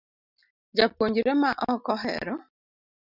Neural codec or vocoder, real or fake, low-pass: none; real; 5.4 kHz